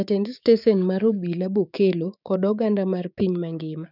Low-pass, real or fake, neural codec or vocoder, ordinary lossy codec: 5.4 kHz; fake; codec, 16 kHz, 6 kbps, DAC; none